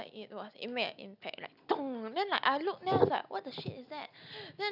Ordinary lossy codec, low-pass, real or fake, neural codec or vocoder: none; 5.4 kHz; real; none